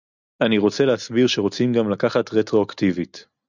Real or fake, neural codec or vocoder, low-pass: real; none; 7.2 kHz